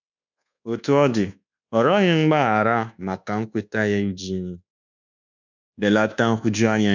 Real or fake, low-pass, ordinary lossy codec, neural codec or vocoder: fake; 7.2 kHz; none; codec, 24 kHz, 1.2 kbps, DualCodec